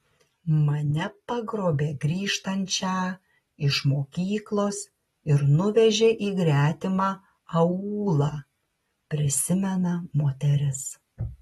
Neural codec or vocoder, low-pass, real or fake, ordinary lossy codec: none; 19.8 kHz; real; AAC, 32 kbps